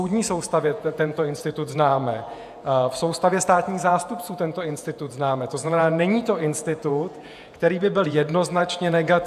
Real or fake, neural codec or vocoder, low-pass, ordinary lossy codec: fake; vocoder, 48 kHz, 128 mel bands, Vocos; 14.4 kHz; AAC, 96 kbps